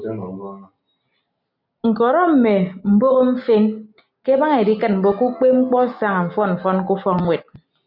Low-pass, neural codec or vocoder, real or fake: 5.4 kHz; none; real